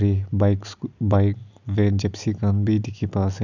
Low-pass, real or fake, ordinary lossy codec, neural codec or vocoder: 7.2 kHz; real; none; none